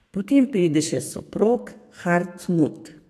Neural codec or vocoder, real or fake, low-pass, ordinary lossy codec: codec, 32 kHz, 1.9 kbps, SNAC; fake; 14.4 kHz; AAC, 64 kbps